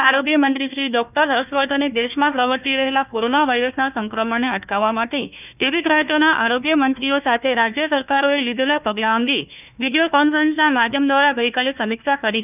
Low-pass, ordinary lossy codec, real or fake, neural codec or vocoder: 3.6 kHz; none; fake; codec, 16 kHz, 1 kbps, FunCodec, trained on Chinese and English, 50 frames a second